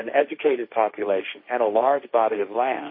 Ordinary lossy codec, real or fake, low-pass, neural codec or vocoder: MP3, 24 kbps; fake; 5.4 kHz; codec, 16 kHz, 1.1 kbps, Voila-Tokenizer